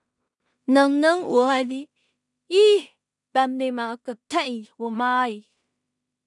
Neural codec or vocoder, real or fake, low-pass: codec, 16 kHz in and 24 kHz out, 0.4 kbps, LongCat-Audio-Codec, two codebook decoder; fake; 10.8 kHz